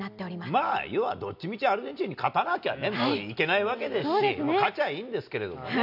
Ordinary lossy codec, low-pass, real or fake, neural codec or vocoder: none; 5.4 kHz; real; none